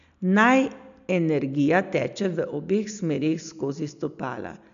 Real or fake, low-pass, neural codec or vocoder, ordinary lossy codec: real; 7.2 kHz; none; none